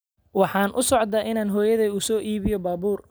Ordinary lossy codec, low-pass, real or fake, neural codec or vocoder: none; none; real; none